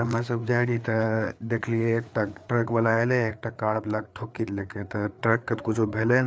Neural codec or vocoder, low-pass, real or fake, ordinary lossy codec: codec, 16 kHz, 4 kbps, FreqCodec, larger model; none; fake; none